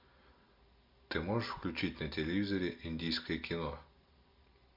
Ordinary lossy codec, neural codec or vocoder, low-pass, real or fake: MP3, 48 kbps; none; 5.4 kHz; real